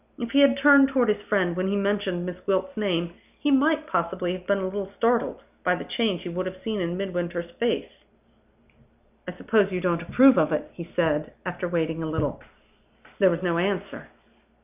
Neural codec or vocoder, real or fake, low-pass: none; real; 3.6 kHz